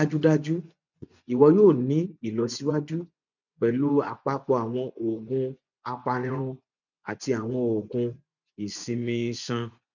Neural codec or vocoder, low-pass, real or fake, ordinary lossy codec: vocoder, 24 kHz, 100 mel bands, Vocos; 7.2 kHz; fake; none